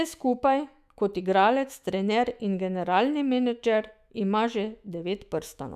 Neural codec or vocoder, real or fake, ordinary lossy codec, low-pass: autoencoder, 48 kHz, 128 numbers a frame, DAC-VAE, trained on Japanese speech; fake; none; 14.4 kHz